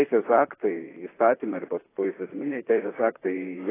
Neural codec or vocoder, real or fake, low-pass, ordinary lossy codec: vocoder, 44.1 kHz, 128 mel bands, Pupu-Vocoder; fake; 3.6 kHz; AAC, 16 kbps